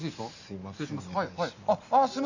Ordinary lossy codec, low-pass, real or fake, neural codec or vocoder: none; 7.2 kHz; real; none